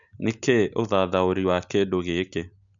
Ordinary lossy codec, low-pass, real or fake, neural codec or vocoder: none; 7.2 kHz; real; none